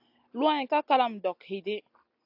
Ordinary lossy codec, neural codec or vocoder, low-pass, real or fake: AAC, 48 kbps; none; 5.4 kHz; real